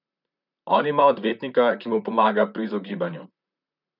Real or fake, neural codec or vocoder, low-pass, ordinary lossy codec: fake; vocoder, 44.1 kHz, 128 mel bands, Pupu-Vocoder; 5.4 kHz; none